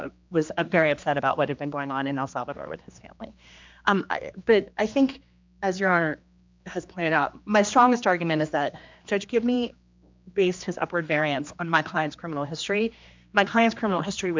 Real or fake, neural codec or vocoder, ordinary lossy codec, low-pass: fake; codec, 16 kHz, 2 kbps, X-Codec, HuBERT features, trained on general audio; MP3, 64 kbps; 7.2 kHz